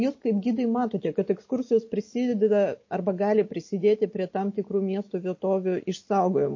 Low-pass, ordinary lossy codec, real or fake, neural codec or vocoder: 7.2 kHz; MP3, 32 kbps; real; none